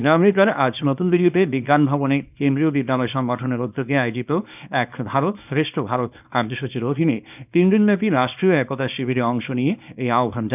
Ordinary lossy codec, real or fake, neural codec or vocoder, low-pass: none; fake; codec, 24 kHz, 0.9 kbps, WavTokenizer, small release; 3.6 kHz